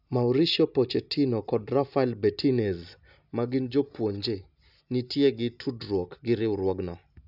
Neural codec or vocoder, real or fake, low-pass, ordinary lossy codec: none; real; 5.4 kHz; none